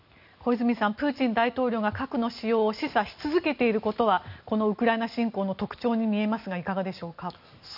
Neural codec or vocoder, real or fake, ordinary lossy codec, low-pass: none; real; none; 5.4 kHz